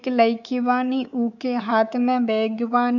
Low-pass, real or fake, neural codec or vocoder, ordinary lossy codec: 7.2 kHz; fake; codec, 24 kHz, 3.1 kbps, DualCodec; none